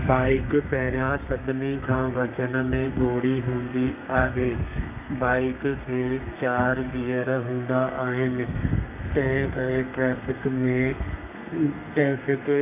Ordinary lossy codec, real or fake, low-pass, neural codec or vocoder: none; fake; 3.6 kHz; codec, 32 kHz, 1.9 kbps, SNAC